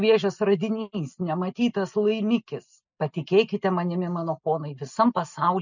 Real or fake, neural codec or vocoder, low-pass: real; none; 7.2 kHz